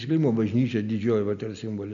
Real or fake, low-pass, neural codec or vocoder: real; 7.2 kHz; none